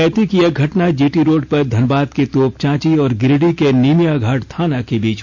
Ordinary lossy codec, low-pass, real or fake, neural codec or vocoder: none; 7.2 kHz; real; none